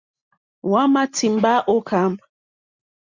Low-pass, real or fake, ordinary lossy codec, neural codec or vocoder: 7.2 kHz; real; Opus, 64 kbps; none